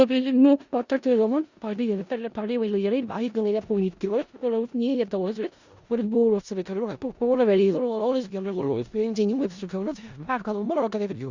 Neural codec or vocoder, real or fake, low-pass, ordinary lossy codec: codec, 16 kHz in and 24 kHz out, 0.4 kbps, LongCat-Audio-Codec, four codebook decoder; fake; 7.2 kHz; Opus, 64 kbps